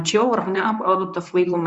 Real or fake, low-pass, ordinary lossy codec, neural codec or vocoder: fake; 10.8 kHz; MP3, 64 kbps; codec, 24 kHz, 0.9 kbps, WavTokenizer, medium speech release version 1